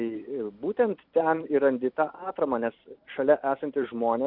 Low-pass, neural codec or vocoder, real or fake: 5.4 kHz; vocoder, 44.1 kHz, 128 mel bands every 256 samples, BigVGAN v2; fake